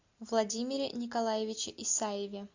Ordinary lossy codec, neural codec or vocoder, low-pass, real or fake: AAC, 48 kbps; none; 7.2 kHz; real